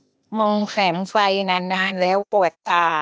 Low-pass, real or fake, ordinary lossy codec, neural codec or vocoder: none; fake; none; codec, 16 kHz, 0.8 kbps, ZipCodec